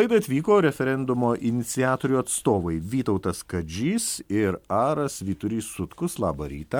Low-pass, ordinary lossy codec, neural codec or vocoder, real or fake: 19.8 kHz; MP3, 96 kbps; none; real